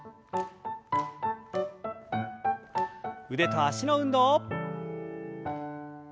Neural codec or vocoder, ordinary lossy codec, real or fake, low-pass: none; none; real; none